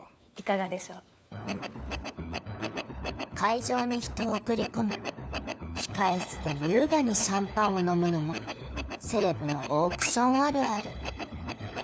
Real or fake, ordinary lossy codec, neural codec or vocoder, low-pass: fake; none; codec, 16 kHz, 4 kbps, FunCodec, trained on LibriTTS, 50 frames a second; none